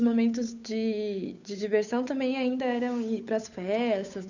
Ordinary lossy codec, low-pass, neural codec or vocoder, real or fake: none; 7.2 kHz; codec, 16 kHz, 8 kbps, FunCodec, trained on Chinese and English, 25 frames a second; fake